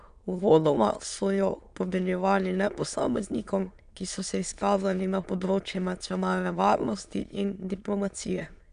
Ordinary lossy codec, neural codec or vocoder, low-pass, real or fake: none; autoencoder, 22.05 kHz, a latent of 192 numbers a frame, VITS, trained on many speakers; 9.9 kHz; fake